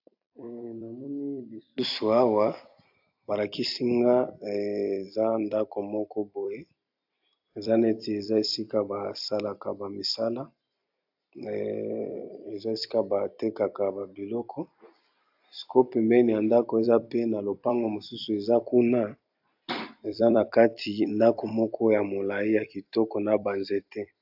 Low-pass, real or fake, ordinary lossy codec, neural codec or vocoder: 5.4 kHz; fake; AAC, 48 kbps; vocoder, 44.1 kHz, 128 mel bands every 256 samples, BigVGAN v2